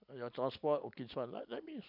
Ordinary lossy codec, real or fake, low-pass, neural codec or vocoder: none; real; 5.4 kHz; none